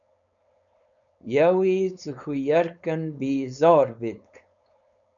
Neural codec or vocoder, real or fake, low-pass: codec, 16 kHz, 4.8 kbps, FACodec; fake; 7.2 kHz